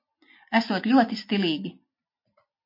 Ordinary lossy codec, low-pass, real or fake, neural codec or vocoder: MP3, 32 kbps; 5.4 kHz; real; none